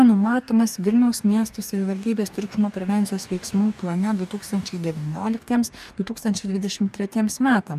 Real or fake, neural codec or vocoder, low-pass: fake; codec, 44.1 kHz, 2.6 kbps, DAC; 14.4 kHz